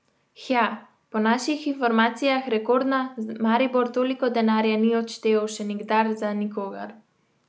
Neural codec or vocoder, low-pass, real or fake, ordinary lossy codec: none; none; real; none